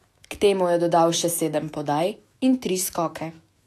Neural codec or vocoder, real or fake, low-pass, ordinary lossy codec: autoencoder, 48 kHz, 128 numbers a frame, DAC-VAE, trained on Japanese speech; fake; 14.4 kHz; AAC, 64 kbps